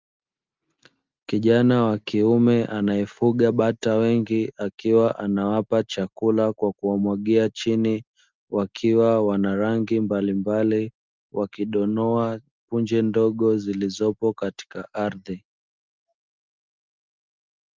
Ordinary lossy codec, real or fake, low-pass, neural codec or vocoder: Opus, 32 kbps; real; 7.2 kHz; none